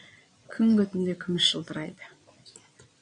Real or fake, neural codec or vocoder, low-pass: fake; vocoder, 22.05 kHz, 80 mel bands, Vocos; 9.9 kHz